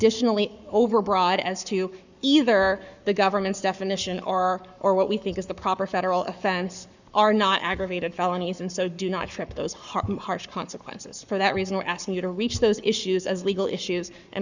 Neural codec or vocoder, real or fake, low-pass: codec, 44.1 kHz, 7.8 kbps, Pupu-Codec; fake; 7.2 kHz